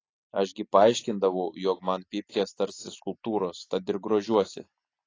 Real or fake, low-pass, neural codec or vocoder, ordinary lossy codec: real; 7.2 kHz; none; AAC, 32 kbps